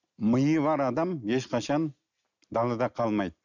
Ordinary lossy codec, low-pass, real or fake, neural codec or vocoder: none; 7.2 kHz; real; none